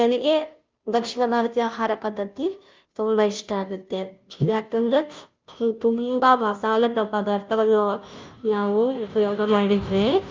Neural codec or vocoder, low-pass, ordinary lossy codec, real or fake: codec, 16 kHz, 0.5 kbps, FunCodec, trained on Chinese and English, 25 frames a second; 7.2 kHz; Opus, 24 kbps; fake